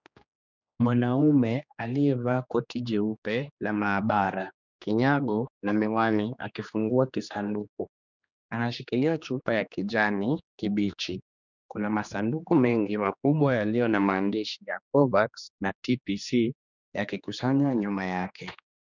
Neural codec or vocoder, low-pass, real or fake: codec, 16 kHz, 2 kbps, X-Codec, HuBERT features, trained on general audio; 7.2 kHz; fake